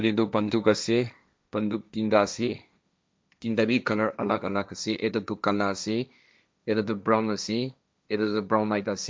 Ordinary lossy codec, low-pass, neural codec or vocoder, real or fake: none; none; codec, 16 kHz, 1.1 kbps, Voila-Tokenizer; fake